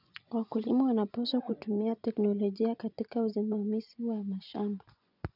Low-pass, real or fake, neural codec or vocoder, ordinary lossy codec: 5.4 kHz; fake; vocoder, 24 kHz, 100 mel bands, Vocos; none